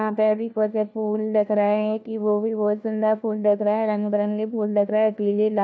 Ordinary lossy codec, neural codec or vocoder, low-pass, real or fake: none; codec, 16 kHz, 1 kbps, FunCodec, trained on LibriTTS, 50 frames a second; none; fake